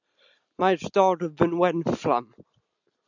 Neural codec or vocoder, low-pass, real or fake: none; 7.2 kHz; real